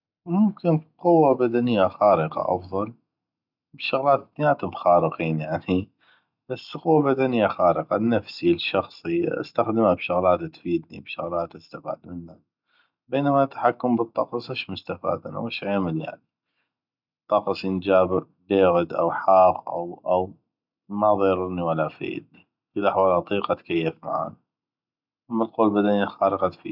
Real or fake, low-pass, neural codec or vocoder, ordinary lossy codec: real; 5.4 kHz; none; none